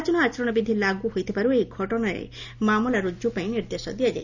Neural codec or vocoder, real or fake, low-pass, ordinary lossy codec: none; real; 7.2 kHz; none